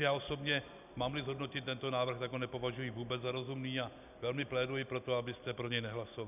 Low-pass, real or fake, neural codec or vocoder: 3.6 kHz; real; none